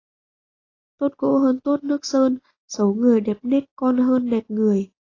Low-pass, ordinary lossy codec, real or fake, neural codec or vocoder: 7.2 kHz; AAC, 32 kbps; real; none